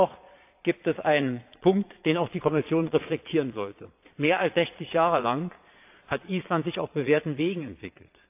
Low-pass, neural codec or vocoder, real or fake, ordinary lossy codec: 3.6 kHz; vocoder, 22.05 kHz, 80 mel bands, Vocos; fake; AAC, 32 kbps